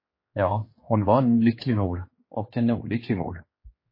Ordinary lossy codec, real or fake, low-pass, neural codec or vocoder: MP3, 24 kbps; fake; 5.4 kHz; codec, 16 kHz, 2 kbps, X-Codec, HuBERT features, trained on general audio